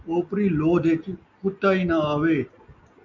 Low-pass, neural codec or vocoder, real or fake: 7.2 kHz; none; real